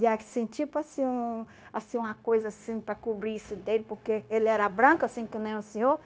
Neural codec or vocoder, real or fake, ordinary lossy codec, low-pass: codec, 16 kHz, 0.9 kbps, LongCat-Audio-Codec; fake; none; none